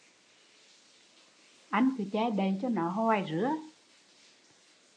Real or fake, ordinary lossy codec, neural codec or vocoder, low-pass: real; AAC, 48 kbps; none; 9.9 kHz